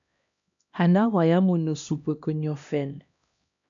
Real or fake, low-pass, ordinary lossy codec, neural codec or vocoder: fake; 7.2 kHz; MP3, 64 kbps; codec, 16 kHz, 1 kbps, X-Codec, HuBERT features, trained on LibriSpeech